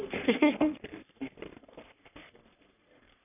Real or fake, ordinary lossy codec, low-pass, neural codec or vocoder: fake; none; 3.6 kHz; codec, 44.1 kHz, 3.4 kbps, Pupu-Codec